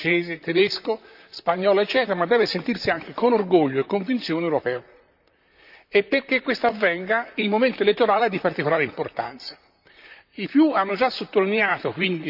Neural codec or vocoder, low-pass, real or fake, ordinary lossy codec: vocoder, 44.1 kHz, 128 mel bands, Pupu-Vocoder; 5.4 kHz; fake; none